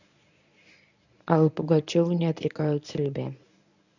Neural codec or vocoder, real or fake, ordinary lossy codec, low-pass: codec, 24 kHz, 0.9 kbps, WavTokenizer, medium speech release version 1; fake; none; 7.2 kHz